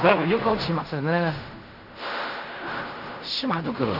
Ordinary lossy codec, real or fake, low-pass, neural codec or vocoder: none; fake; 5.4 kHz; codec, 16 kHz in and 24 kHz out, 0.4 kbps, LongCat-Audio-Codec, fine tuned four codebook decoder